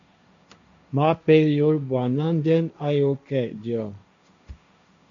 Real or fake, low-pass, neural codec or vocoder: fake; 7.2 kHz; codec, 16 kHz, 1.1 kbps, Voila-Tokenizer